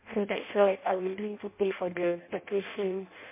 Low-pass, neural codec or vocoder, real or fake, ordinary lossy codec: 3.6 kHz; codec, 16 kHz in and 24 kHz out, 0.6 kbps, FireRedTTS-2 codec; fake; MP3, 24 kbps